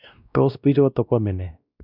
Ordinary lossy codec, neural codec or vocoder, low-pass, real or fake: none; codec, 16 kHz, 1 kbps, X-Codec, WavLM features, trained on Multilingual LibriSpeech; 5.4 kHz; fake